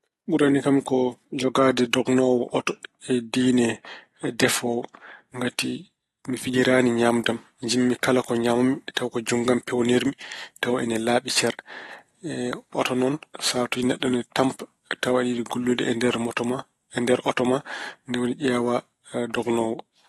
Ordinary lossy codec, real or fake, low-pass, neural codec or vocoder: AAC, 32 kbps; real; 19.8 kHz; none